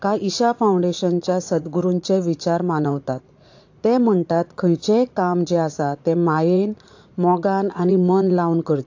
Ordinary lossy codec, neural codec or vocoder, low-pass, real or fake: AAC, 48 kbps; vocoder, 44.1 kHz, 80 mel bands, Vocos; 7.2 kHz; fake